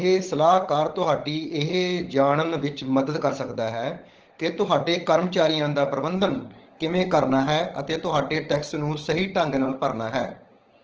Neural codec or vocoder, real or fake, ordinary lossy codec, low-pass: codec, 16 kHz, 16 kbps, FunCodec, trained on LibriTTS, 50 frames a second; fake; Opus, 16 kbps; 7.2 kHz